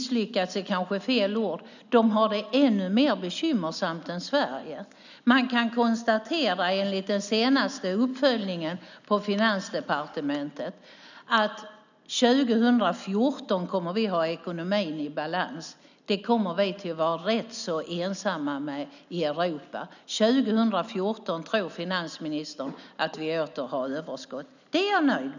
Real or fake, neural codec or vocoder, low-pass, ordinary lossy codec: real; none; 7.2 kHz; none